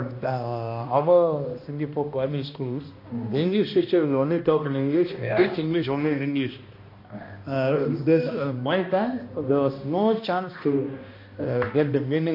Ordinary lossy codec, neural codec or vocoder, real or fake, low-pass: MP3, 32 kbps; codec, 16 kHz, 1 kbps, X-Codec, HuBERT features, trained on balanced general audio; fake; 5.4 kHz